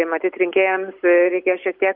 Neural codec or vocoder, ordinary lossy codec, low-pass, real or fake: none; MP3, 48 kbps; 5.4 kHz; real